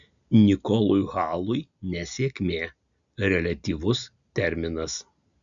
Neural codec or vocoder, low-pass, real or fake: none; 7.2 kHz; real